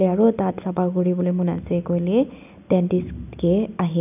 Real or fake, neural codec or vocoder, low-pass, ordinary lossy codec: real; none; 3.6 kHz; none